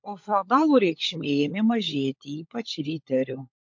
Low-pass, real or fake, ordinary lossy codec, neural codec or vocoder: 7.2 kHz; fake; MP3, 48 kbps; codec, 16 kHz, 16 kbps, FunCodec, trained on LibriTTS, 50 frames a second